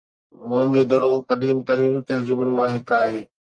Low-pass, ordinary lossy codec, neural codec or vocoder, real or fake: 9.9 kHz; Opus, 32 kbps; codec, 44.1 kHz, 1.7 kbps, Pupu-Codec; fake